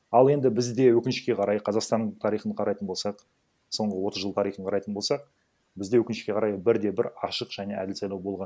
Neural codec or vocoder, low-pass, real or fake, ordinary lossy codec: none; none; real; none